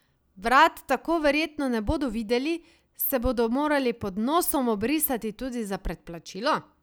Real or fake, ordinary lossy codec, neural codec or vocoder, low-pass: real; none; none; none